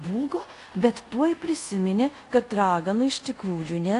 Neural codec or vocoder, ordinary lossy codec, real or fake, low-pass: codec, 24 kHz, 0.5 kbps, DualCodec; AAC, 48 kbps; fake; 10.8 kHz